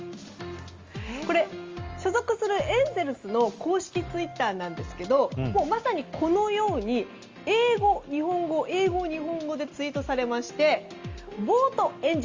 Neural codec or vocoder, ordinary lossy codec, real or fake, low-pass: none; Opus, 32 kbps; real; 7.2 kHz